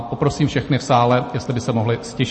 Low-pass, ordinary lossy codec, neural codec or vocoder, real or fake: 10.8 kHz; MP3, 32 kbps; none; real